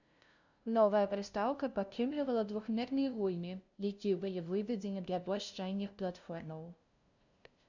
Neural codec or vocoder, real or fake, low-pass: codec, 16 kHz, 0.5 kbps, FunCodec, trained on LibriTTS, 25 frames a second; fake; 7.2 kHz